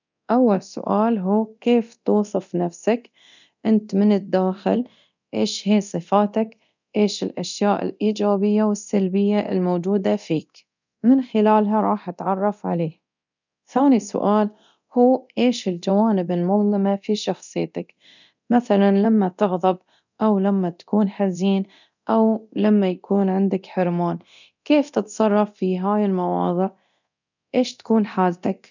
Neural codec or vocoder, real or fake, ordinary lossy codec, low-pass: codec, 24 kHz, 0.9 kbps, DualCodec; fake; none; 7.2 kHz